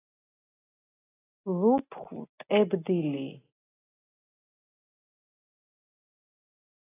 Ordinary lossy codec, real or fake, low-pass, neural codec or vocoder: AAC, 16 kbps; real; 3.6 kHz; none